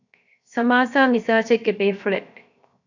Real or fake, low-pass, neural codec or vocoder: fake; 7.2 kHz; codec, 16 kHz, 0.7 kbps, FocalCodec